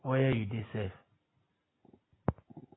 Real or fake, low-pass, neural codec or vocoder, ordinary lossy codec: real; 7.2 kHz; none; AAC, 16 kbps